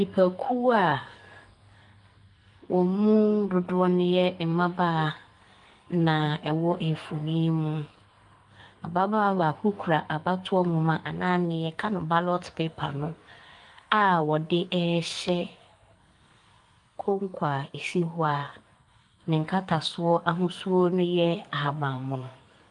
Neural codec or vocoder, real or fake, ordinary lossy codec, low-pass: codec, 44.1 kHz, 2.6 kbps, SNAC; fake; Opus, 32 kbps; 10.8 kHz